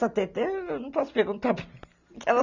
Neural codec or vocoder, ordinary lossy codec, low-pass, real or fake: none; none; 7.2 kHz; real